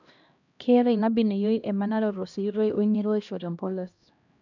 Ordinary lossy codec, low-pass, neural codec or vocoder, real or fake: none; 7.2 kHz; codec, 16 kHz, 1 kbps, X-Codec, HuBERT features, trained on LibriSpeech; fake